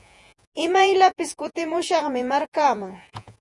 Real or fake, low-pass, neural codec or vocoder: fake; 10.8 kHz; vocoder, 48 kHz, 128 mel bands, Vocos